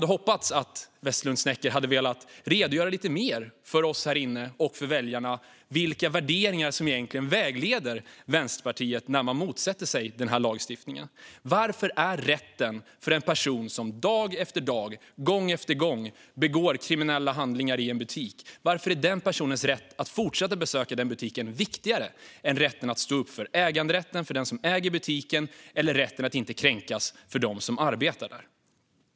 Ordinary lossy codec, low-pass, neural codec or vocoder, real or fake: none; none; none; real